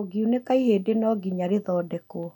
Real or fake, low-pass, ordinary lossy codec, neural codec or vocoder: real; 19.8 kHz; none; none